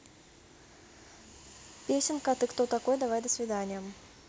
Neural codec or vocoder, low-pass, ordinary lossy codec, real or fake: none; none; none; real